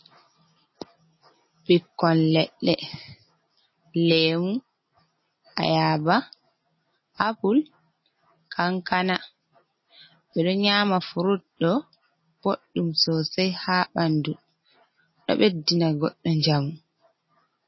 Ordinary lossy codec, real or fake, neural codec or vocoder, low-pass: MP3, 24 kbps; real; none; 7.2 kHz